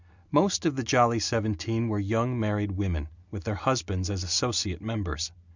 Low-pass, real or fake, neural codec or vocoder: 7.2 kHz; real; none